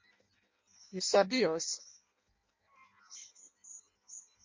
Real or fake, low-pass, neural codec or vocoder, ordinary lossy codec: fake; 7.2 kHz; codec, 16 kHz in and 24 kHz out, 0.6 kbps, FireRedTTS-2 codec; MP3, 48 kbps